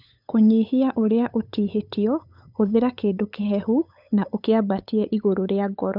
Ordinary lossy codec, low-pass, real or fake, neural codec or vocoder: none; 5.4 kHz; fake; codec, 16 kHz, 8 kbps, FunCodec, trained on LibriTTS, 25 frames a second